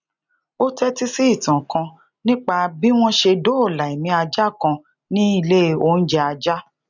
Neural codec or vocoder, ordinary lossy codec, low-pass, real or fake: none; none; 7.2 kHz; real